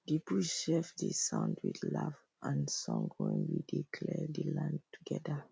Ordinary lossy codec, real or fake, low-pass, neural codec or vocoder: none; real; none; none